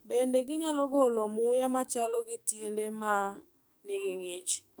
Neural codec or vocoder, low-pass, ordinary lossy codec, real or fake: codec, 44.1 kHz, 2.6 kbps, SNAC; none; none; fake